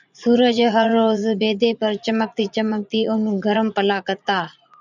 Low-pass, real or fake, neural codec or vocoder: 7.2 kHz; fake; vocoder, 44.1 kHz, 80 mel bands, Vocos